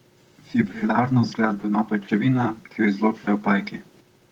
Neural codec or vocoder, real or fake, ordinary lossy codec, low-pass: vocoder, 44.1 kHz, 128 mel bands, Pupu-Vocoder; fake; Opus, 24 kbps; 19.8 kHz